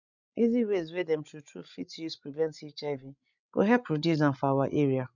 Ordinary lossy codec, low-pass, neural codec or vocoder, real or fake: none; 7.2 kHz; codec, 16 kHz, 16 kbps, FreqCodec, larger model; fake